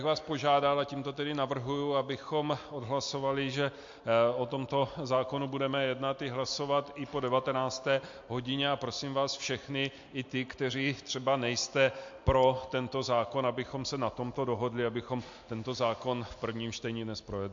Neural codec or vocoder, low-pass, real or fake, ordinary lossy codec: none; 7.2 kHz; real; MP3, 48 kbps